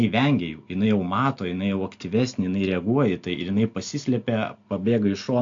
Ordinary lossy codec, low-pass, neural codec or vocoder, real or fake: MP3, 48 kbps; 7.2 kHz; none; real